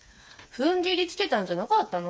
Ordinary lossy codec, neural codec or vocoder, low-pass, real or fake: none; codec, 16 kHz, 4 kbps, FreqCodec, smaller model; none; fake